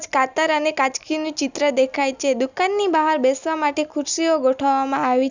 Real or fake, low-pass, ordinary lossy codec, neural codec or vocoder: real; 7.2 kHz; none; none